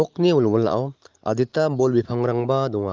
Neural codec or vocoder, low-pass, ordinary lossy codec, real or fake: none; 7.2 kHz; Opus, 32 kbps; real